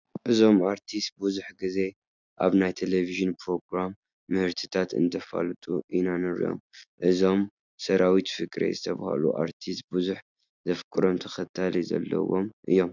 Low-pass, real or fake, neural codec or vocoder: 7.2 kHz; real; none